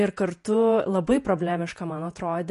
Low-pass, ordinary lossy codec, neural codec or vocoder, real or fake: 14.4 kHz; MP3, 48 kbps; vocoder, 48 kHz, 128 mel bands, Vocos; fake